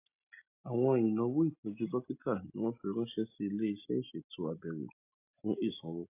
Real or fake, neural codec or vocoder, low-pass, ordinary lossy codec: real; none; 3.6 kHz; AAC, 32 kbps